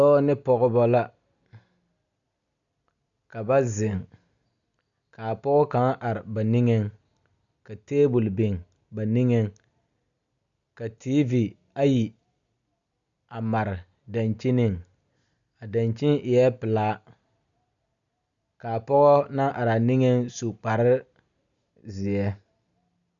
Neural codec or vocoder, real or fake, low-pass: none; real; 7.2 kHz